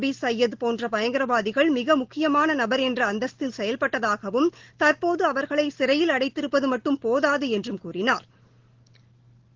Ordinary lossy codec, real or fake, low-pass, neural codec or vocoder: Opus, 16 kbps; real; 7.2 kHz; none